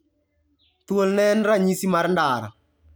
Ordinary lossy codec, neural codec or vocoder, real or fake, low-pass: none; none; real; none